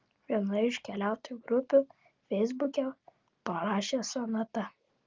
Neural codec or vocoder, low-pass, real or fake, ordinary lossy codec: none; 7.2 kHz; real; Opus, 32 kbps